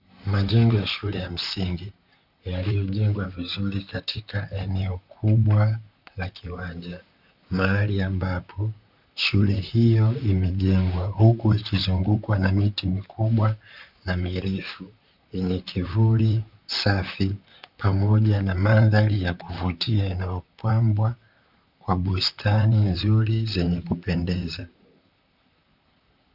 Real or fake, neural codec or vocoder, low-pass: fake; vocoder, 44.1 kHz, 128 mel bands, Pupu-Vocoder; 5.4 kHz